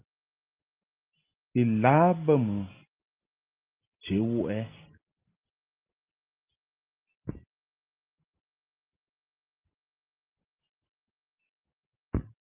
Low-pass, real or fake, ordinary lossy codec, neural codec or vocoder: 3.6 kHz; real; Opus, 24 kbps; none